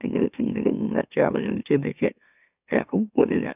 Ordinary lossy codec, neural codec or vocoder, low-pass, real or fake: none; autoencoder, 44.1 kHz, a latent of 192 numbers a frame, MeloTTS; 3.6 kHz; fake